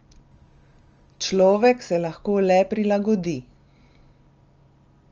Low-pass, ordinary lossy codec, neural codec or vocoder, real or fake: 7.2 kHz; Opus, 24 kbps; none; real